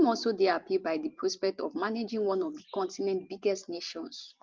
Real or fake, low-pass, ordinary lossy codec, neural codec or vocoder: fake; 7.2 kHz; Opus, 32 kbps; vocoder, 44.1 kHz, 128 mel bands every 512 samples, BigVGAN v2